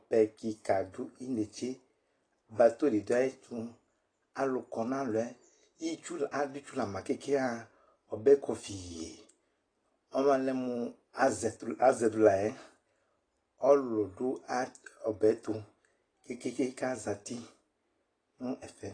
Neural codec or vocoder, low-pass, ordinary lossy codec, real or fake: none; 9.9 kHz; AAC, 32 kbps; real